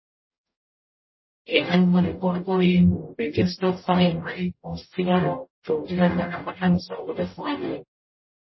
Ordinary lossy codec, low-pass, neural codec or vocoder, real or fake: MP3, 24 kbps; 7.2 kHz; codec, 44.1 kHz, 0.9 kbps, DAC; fake